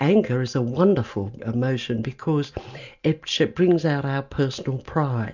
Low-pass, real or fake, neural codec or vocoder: 7.2 kHz; real; none